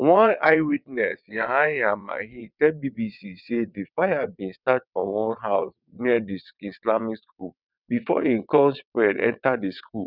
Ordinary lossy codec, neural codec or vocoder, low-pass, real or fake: none; vocoder, 22.05 kHz, 80 mel bands, WaveNeXt; 5.4 kHz; fake